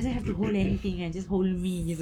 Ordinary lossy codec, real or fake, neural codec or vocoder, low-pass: none; fake; codec, 44.1 kHz, 7.8 kbps, Pupu-Codec; 19.8 kHz